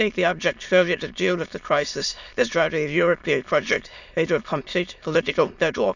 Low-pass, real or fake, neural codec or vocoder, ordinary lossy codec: 7.2 kHz; fake; autoencoder, 22.05 kHz, a latent of 192 numbers a frame, VITS, trained on many speakers; none